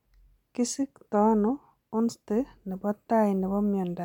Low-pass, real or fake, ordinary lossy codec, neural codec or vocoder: 19.8 kHz; real; MP3, 96 kbps; none